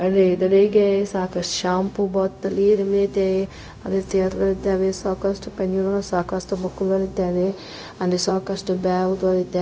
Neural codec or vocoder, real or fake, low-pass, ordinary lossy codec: codec, 16 kHz, 0.4 kbps, LongCat-Audio-Codec; fake; none; none